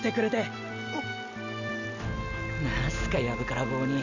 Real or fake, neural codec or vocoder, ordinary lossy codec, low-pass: real; none; none; 7.2 kHz